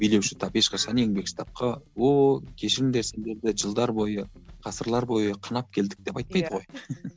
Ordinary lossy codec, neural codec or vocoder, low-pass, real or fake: none; none; none; real